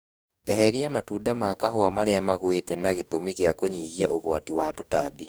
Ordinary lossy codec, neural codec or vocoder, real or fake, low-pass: none; codec, 44.1 kHz, 2.6 kbps, DAC; fake; none